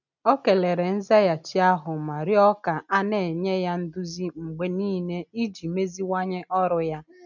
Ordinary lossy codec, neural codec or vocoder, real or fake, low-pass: none; none; real; 7.2 kHz